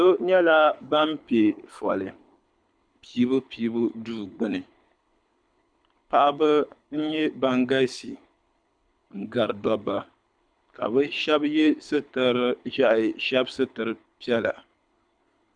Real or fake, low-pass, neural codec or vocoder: fake; 9.9 kHz; codec, 24 kHz, 6 kbps, HILCodec